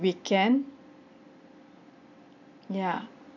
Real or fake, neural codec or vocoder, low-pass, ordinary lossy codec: real; none; 7.2 kHz; none